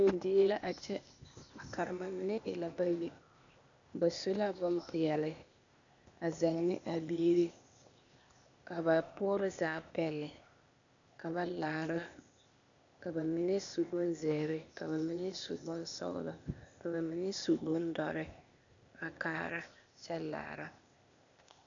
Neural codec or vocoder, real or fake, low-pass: codec, 16 kHz, 0.8 kbps, ZipCodec; fake; 7.2 kHz